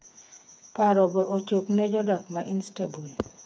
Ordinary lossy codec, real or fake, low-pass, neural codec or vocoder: none; fake; none; codec, 16 kHz, 4 kbps, FreqCodec, smaller model